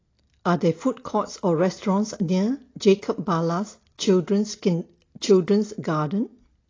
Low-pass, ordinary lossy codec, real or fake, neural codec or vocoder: 7.2 kHz; AAC, 32 kbps; real; none